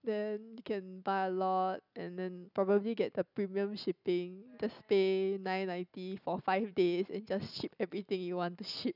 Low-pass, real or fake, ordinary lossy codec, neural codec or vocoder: 5.4 kHz; real; none; none